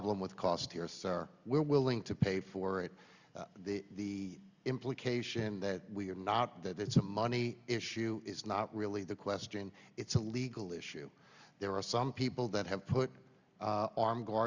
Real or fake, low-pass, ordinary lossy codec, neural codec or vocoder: real; 7.2 kHz; Opus, 64 kbps; none